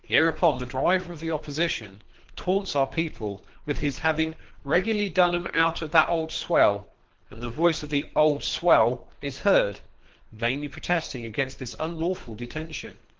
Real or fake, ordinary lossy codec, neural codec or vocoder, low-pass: fake; Opus, 16 kbps; codec, 24 kHz, 3 kbps, HILCodec; 7.2 kHz